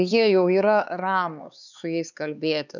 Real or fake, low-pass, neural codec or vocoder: fake; 7.2 kHz; codec, 16 kHz, 4 kbps, X-Codec, HuBERT features, trained on LibriSpeech